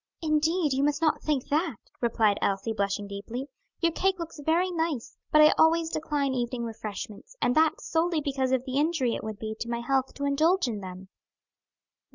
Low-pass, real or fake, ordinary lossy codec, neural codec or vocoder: 7.2 kHz; real; Opus, 64 kbps; none